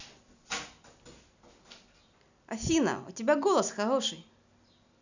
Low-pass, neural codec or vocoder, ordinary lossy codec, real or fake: 7.2 kHz; none; none; real